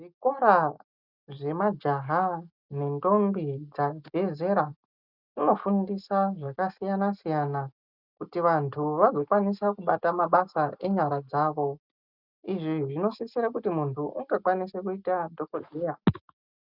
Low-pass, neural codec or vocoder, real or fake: 5.4 kHz; none; real